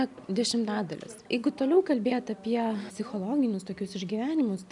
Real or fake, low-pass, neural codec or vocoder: real; 10.8 kHz; none